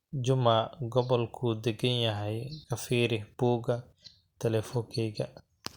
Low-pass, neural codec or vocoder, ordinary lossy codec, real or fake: 19.8 kHz; none; Opus, 64 kbps; real